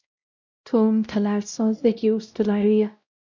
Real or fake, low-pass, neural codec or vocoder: fake; 7.2 kHz; codec, 16 kHz, 0.5 kbps, X-Codec, WavLM features, trained on Multilingual LibriSpeech